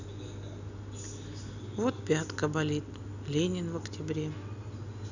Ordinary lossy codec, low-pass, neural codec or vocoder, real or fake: none; 7.2 kHz; none; real